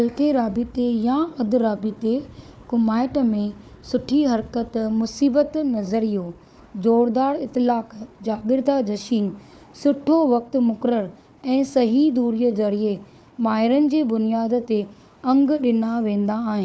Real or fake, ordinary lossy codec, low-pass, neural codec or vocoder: fake; none; none; codec, 16 kHz, 4 kbps, FunCodec, trained on Chinese and English, 50 frames a second